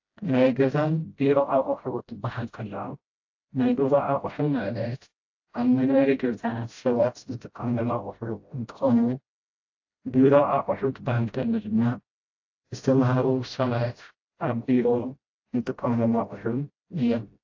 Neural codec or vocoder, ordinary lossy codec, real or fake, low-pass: codec, 16 kHz, 0.5 kbps, FreqCodec, smaller model; AAC, 48 kbps; fake; 7.2 kHz